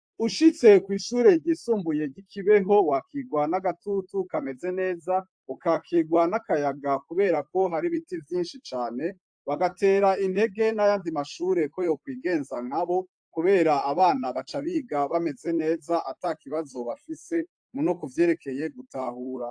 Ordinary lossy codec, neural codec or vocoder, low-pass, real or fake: AAC, 64 kbps; vocoder, 44.1 kHz, 128 mel bands, Pupu-Vocoder; 9.9 kHz; fake